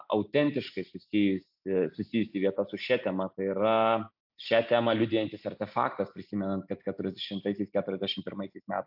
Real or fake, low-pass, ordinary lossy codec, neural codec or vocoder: real; 5.4 kHz; AAC, 48 kbps; none